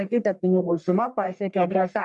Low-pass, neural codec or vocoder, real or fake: 10.8 kHz; codec, 44.1 kHz, 1.7 kbps, Pupu-Codec; fake